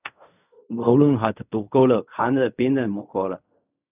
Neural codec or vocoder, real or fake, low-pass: codec, 16 kHz in and 24 kHz out, 0.4 kbps, LongCat-Audio-Codec, fine tuned four codebook decoder; fake; 3.6 kHz